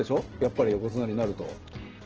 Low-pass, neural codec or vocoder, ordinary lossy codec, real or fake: 7.2 kHz; none; Opus, 16 kbps; real